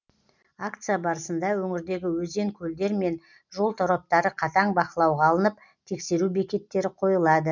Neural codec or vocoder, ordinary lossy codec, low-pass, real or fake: none; none; 7.2 kHz; real